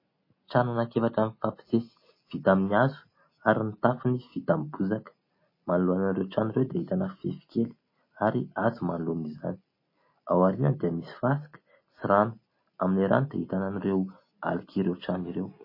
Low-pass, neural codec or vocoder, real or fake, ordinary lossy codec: 5.4 kHz; none; real; MP3, 24 kbps